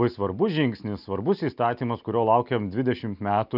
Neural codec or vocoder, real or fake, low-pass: none; real; 5.4 kHz